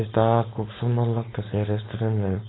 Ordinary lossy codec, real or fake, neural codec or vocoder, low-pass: AAC, 16 kbps; fake; codec, 16 kHz, 4.8 kbps, FACodec; 7.2 kHz